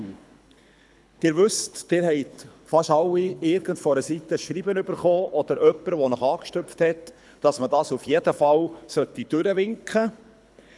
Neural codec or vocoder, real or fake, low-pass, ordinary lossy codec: codec, 24 kHz, 6 kbps, HILCodec; fake; none; none